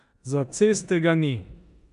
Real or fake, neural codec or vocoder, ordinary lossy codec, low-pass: fake; codec, 16 kHz in and 24 kHz out, 0.9 kbps, LongCat-Audio-Codec, four codebook decoder; none; 10.8 kHz